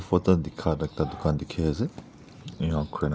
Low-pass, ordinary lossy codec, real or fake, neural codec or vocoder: none; none; real; none